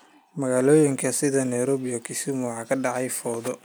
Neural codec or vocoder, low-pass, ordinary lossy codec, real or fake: none; none; none; real